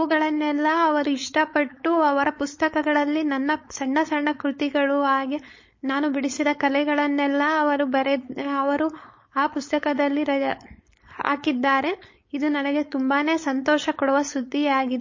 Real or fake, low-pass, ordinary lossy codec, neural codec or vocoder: fake; 7.2 kHz; MP3, 32 kbps; codec, 16 kHz, 4.8 kbps, FACodec